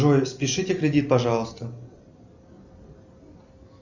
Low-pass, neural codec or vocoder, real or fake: 7.2 kHz; none; real